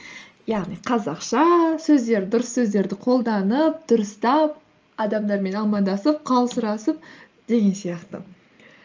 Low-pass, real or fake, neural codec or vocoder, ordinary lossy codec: 7.2 kHz; real; none; Opus, 24 kbps